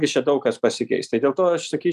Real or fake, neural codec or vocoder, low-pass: real; none; 14.4 kHz